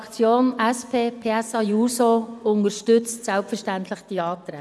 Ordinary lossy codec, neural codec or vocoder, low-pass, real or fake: none; vocoder, 24 kHz, 100 mel bands, Vocos; none; fake